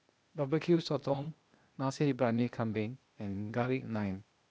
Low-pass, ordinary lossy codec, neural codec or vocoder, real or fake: none; none; codec, 16 kHz, 0.8 kbps, ZipCodec; fake